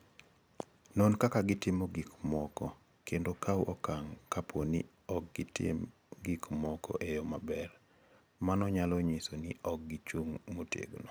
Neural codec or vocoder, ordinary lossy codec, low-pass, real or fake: none; none; none; real